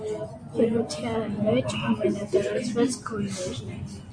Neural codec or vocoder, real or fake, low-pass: none; real; 9.9 kHz